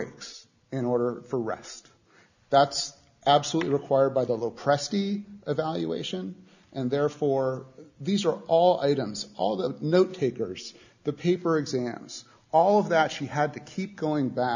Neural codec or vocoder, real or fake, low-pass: none; real; 7.2 kHz